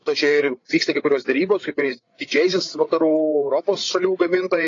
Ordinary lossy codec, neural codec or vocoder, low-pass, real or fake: AAC, 32 kbps; codec, 16 kHz, 8 kbps, FreqCodec, larger model; 7.2 kHz; fake